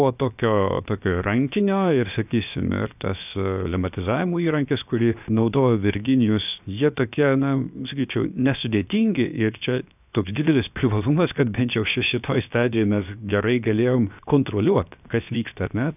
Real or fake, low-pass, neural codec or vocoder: fake; 3.6 kHz; codec, 16 kHz, 0.7 kbps, FocalCodec